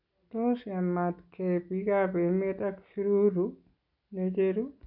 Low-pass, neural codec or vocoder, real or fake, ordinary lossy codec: 5.4 kHz; none; real; none